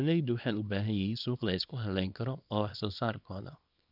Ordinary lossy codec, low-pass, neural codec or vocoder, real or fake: none; 5.4 kHz; codec, 24 kHz, 0.9 kbps, WavTokenizer, small release; fake